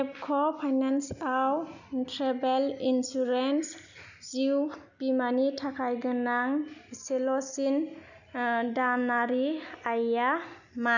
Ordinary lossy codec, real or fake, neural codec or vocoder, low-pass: none; real; none; 7.2 kHz